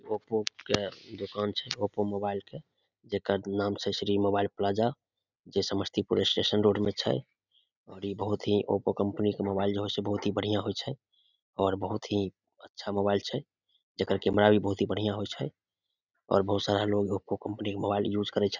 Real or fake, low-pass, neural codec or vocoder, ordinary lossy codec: real; 7.2 kHz; none; none